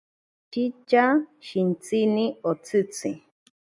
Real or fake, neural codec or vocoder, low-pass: real; none; 10.8 kHz